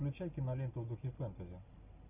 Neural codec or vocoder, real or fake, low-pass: none; real; 3.6 kHz